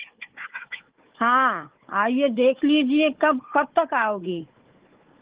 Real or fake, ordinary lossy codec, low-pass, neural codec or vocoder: fake; Opus, 16 kbps; 3.6 kHz; codec, 16 kHz, 4 kbps, FunCodec, trained on Chinese and English, 50 frames a second